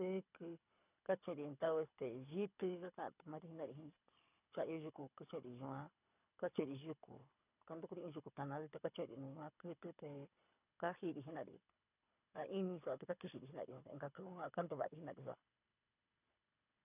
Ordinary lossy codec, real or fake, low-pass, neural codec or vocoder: none; fake; 3.6 kHz; codec, 24 kHz, 6 kbps, HILCodec